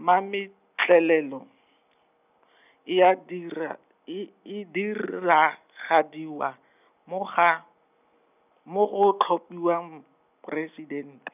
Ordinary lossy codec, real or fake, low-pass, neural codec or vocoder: none; real; 3.6 kHz; none